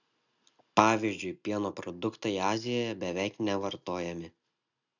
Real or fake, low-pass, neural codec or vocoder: real; 7.2 kHz; none